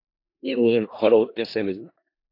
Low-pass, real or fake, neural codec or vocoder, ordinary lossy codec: 5.4 kHz; fake; codec, 16 kHz in and 24 kHz out, 0.4 kbps, LongCat-Audio-Codec, four codebook decoder; AAC, 32 kbps